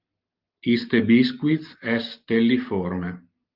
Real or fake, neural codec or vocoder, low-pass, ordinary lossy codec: real; none; 5.4 kHz; Opus, 32 kbps